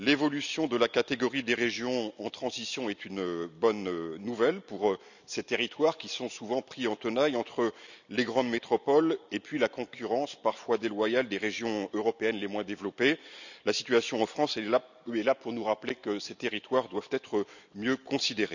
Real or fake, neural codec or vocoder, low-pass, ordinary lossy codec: real; none; 7.2 kHz; none